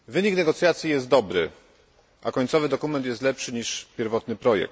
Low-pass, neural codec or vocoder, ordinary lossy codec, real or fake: none; none; none; real